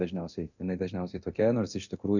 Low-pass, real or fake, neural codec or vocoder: 7.2 kHz; fake; codec, 24 kHz, 0.9 kbps, DualCodec